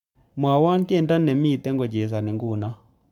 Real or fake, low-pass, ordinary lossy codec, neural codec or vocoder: fake; 19.8 kHz; none; codec, 44.1 kHz, 7.8 kbps, Pupu-Codec